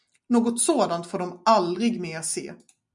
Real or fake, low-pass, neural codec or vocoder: real; 10.8 kHz; none